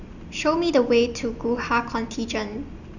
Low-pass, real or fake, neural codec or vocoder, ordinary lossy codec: 7.2 kHz; real; none; none